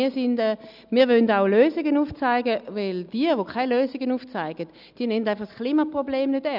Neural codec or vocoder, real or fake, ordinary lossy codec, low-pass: none; real; none; 5.4 kHz